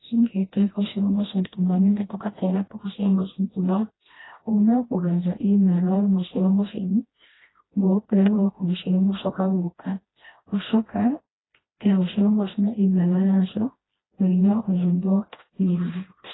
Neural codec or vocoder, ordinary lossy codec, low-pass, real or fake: codec, 16 kHz, 1 kbps, FreqCodec, smaller model; AAC, 16 kbps; 7.2 kHz; fake